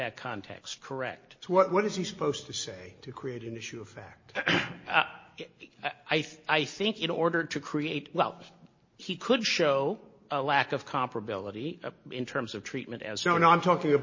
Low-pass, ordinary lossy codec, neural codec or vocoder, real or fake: 7.2 kHz; MP3, 32 kbps; none; real